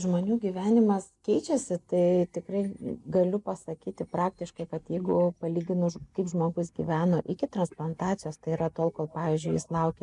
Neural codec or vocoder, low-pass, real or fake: none; 10.8 kHz; real